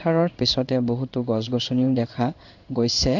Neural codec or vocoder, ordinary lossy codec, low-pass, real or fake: codec, 16 kHz in and 24 kHz out, 1 kbps, XY-Tokenizer; none; 7.2 kHz; fake